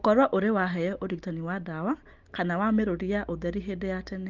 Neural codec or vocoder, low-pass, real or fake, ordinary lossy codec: none; 7.2 kHz; real; Opus, 32 kbps